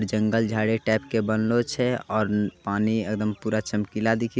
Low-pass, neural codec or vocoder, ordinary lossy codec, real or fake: none; none; none; real